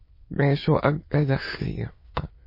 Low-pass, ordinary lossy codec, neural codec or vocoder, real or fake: 5.4 kHz; MP3, 24 kbps; autoencoder, 22.05 kHz, a latent of 192 numbers a frame, VITS, trained on many speakers; fake